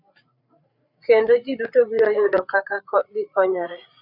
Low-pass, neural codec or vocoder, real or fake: 5.4 kHz; codec, 16 kHz, 8 kbps, FreqCodec, larger model; fake